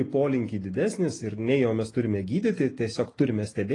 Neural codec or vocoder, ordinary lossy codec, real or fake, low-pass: none; AAC, 32 kbps; real; 10.8 kHz